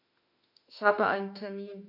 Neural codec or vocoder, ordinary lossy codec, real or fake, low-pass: autoencoder, 48 kHz, 32 numbers a frame, DAC-VAE, trained on Japanese speech; none; fake; 5.4 kHz